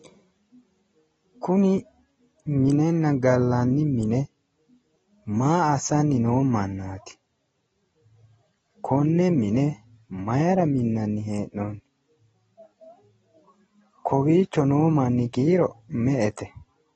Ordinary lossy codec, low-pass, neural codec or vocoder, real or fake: AAC, 24 kbps; 10.8 kHz; none; real